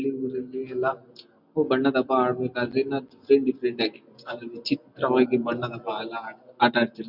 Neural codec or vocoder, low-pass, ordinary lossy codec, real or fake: none; 5.4 kHz; none; real